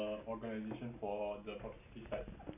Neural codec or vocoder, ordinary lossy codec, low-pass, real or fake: none; none; 3.6 kHz; real